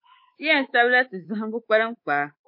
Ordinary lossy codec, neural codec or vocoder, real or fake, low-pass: MP3, 32 kbps; codec, 24 kHz, 3.1 kbps, DualCodec; fake; 5.4 kHz